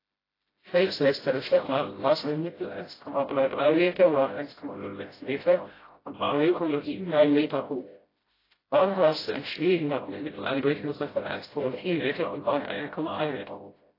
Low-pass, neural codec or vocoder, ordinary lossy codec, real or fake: 5.4 kHz; codec, 16 kHz, 0.5 kbps, FreqCodec, smaller model; AAC, 24 kbps; fake